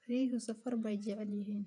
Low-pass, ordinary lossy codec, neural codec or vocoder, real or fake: 10.8 kHz; none; vocoder, 48 kHz, 128 mel bands, Vocos; fake